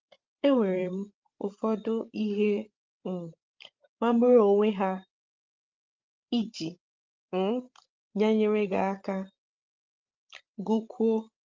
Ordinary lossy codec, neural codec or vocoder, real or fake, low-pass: Opus, 24 kbps; codec, 16 kHz, 16 kbps, FreqCodec, larger model; fake; 7.2 kHz